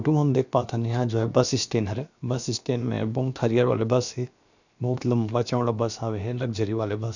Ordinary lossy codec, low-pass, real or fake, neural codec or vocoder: none; 7.2 kHz; fake; codec, 16 kHz, about 1 kbps, DyCAST, with the encoder's durations